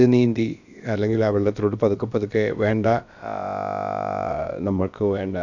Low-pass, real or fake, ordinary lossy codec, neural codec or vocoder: 7.2 kHz; fake; none; codec, 16 kHz, about 1 kbps, DyCAST, with the encoder's durations